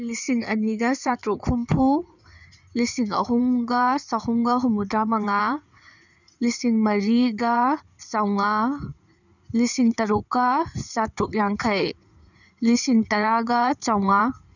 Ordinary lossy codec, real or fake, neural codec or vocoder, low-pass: none; fake; codec, 16 kHz in and 24 kHz out, 2.2 kbps, FireRedTTS-2 codec; 7.2 kHz